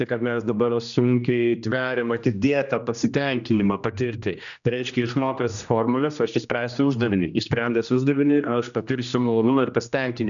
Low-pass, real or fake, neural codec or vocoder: 7.2 kHz; fake; codec, 16 kHz, 1 kbps, X-Codec, HuBERT features, trained on general audio